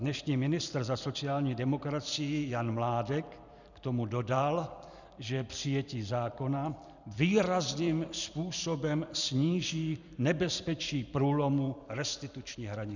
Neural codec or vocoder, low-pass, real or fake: vocoder, 44.1 kHz, 128 mel bands every 256 samples, BigVGAN v2; 7.2 kHz; fake